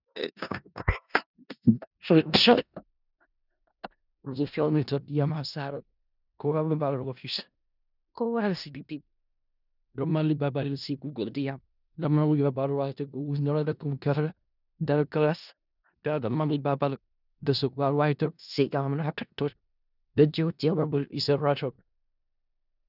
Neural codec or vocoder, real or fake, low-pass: codec, 16 kHz in and 24 kHz out, 0.4 kbps, LongCat-Audio-Codec, four codebook decoder; fake; 5.4 kHz